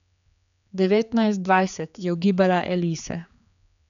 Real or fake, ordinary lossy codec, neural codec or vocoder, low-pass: fake; none; codec, 16 kHz, 4 kbps, X-Codec, HuBERT features, trained on general audio; 7.2 kHz